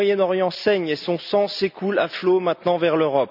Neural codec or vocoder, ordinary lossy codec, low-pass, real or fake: none; none; 5.4 kHz; real